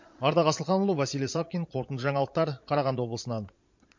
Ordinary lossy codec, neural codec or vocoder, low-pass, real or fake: MP3, 48 kbps; vocoder, 22.05 kHz, 80 mel bands, Vocos; 7.2 kHz; fake